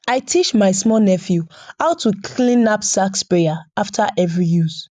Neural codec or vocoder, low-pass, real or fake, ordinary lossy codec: none; 10.8 kHz; real; none